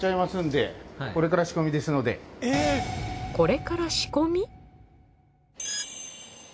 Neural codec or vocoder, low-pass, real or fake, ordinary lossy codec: none; none; real; none